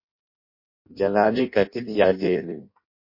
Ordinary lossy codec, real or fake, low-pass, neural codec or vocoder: MP3, 32 kbps; fake; 5.4 kHz; codec, 16 kHz in and 24 kHz out, 0.6 kbps, FireRedTTS-2 codec